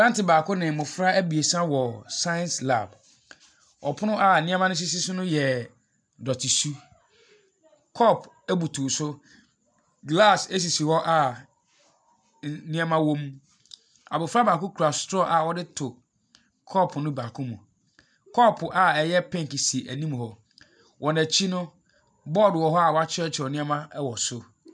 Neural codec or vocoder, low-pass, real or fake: none; 9.9 kHz; real